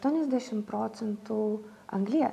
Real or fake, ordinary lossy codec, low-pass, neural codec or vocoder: real; AAC, 96 kbps; 14.4 kHz; none